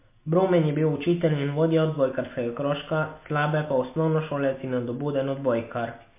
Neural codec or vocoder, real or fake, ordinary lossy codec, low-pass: none; real; MP3, 24 kbps; 3.6 kHz